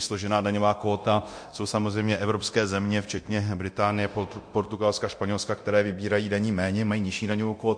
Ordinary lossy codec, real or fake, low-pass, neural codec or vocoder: MP3, 48 kbps; fake; 9.9 kHz; codec, 24 kHz, 0.9 kbps, DualCodec